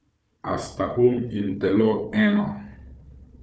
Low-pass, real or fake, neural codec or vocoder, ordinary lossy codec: none; fake; codec, 16 kHz, 4 kbps, FunCodec, trained on Chinese and English, 50 frames a second; none